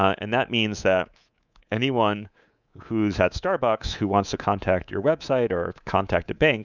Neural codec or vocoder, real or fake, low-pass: codec, 24 kHz, 3.1 kbps, DualCodec; fake; 7.2 kHz